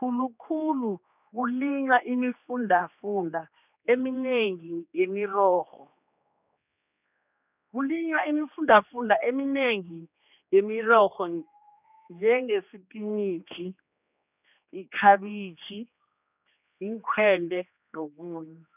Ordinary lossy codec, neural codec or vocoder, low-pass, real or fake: none; codec, 16 kHz, 2 kbps, X-Codec, HuBERT features, trained on general audio; 3.6 kHz; fake